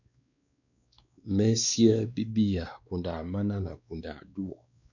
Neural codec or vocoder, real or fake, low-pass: codec, 16 kHz, 2 kbps, X-Codec, WavLM features, trained on Multilingual LibriSpeech; fake; 7.2 kHz